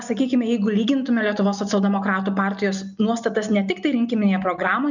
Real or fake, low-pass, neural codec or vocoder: real; 7.2 kHz; none